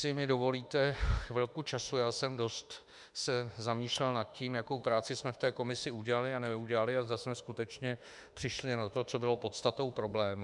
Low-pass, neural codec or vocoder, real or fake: 10.8 kHz; autoencoder, 48 kHz, 32 numbers a frame, DAC-VAE, trained on Japanese speech; fake